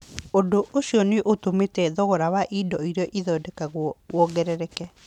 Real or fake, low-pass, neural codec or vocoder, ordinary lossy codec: real; 19.8 kHz; none; none